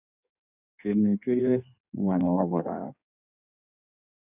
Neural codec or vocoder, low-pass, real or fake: codec, 16 kHz in and 24 kHz out, 1.1 kbps, FireRedTTS-2 codec; 3.6 kHz; fake